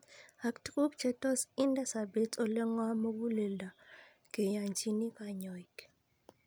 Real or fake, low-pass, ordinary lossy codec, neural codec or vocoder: real; none; none; none